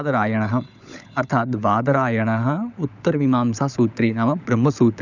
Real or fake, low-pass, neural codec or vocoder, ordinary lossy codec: fake; 7.2 kHz; codec, 16 kHz, 16 kbps, FunCodec, trained on LibriTTS, 50 frames a second; none